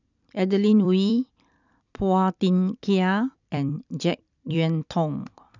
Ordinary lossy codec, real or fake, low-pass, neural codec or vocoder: none; fake; 7.2 kHz; vocoder, 44.1 kHz, 128 mel bands every 256 samples, BigVGAN v2